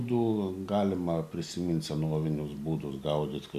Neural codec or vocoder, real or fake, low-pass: none; real; 14.4 kHz